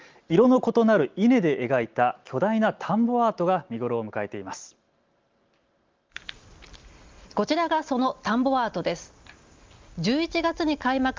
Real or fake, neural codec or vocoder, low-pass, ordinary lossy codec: real; none; 7.2 kHz; Opus, 32 kbps